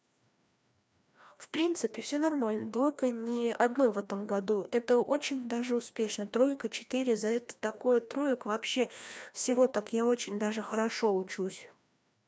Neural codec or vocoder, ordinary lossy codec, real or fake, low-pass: codec, 16 kHz, 1 kbps, FreqCodec, larger model; none; fake; none